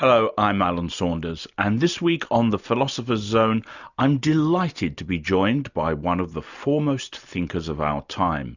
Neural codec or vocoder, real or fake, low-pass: none; real; 7.2 kHz